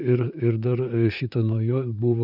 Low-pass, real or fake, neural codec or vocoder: 5.4 kHz; fake; vocoder, 44.1 kHz, 128 mel bands, Pupu-Vocoder